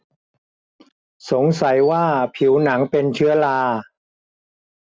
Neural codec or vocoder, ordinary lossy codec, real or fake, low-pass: none; none; real; none